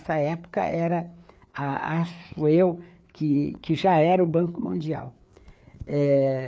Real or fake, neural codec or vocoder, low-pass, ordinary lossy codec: fake; codec, 16 kHz, 4 kbps, FreqCodec, larger model; none; none